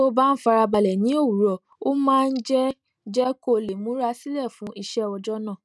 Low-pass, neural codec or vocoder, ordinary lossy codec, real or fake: none; none; none; real